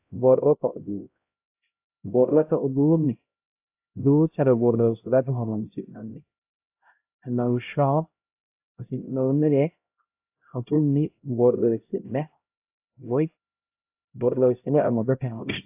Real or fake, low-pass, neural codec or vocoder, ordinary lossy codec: fake; 3.6 kHz; codec, 16 kHz, 0.5 kbps, X-Codec, HuBERT features, trained on LibriSpeech; AAC, 32 kbps